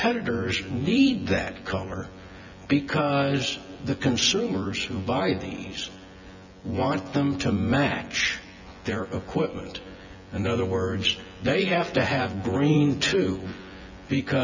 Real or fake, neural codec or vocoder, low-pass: fake; vocoder, 24 kHz, 100 mel bands, Vocos; 7.2 kHz